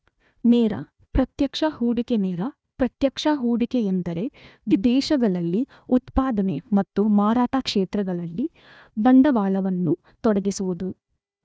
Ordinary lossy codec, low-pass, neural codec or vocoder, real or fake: none; none; codec, 16 kHz, 1 kbps, FunCodec, trained on Chinese and English, 50 frames a second; fake